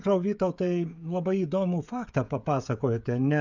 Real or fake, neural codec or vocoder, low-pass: fake; codec, 16 kHz, 16 kbps, FreqCodec, smaller model; 7.2 kHz